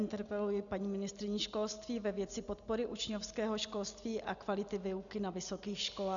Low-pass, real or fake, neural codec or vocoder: 7.2 kHz; real; none